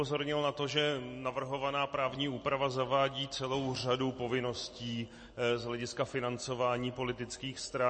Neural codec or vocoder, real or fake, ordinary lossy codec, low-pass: none; real; MP3, 32 kbps; 9.9 kHz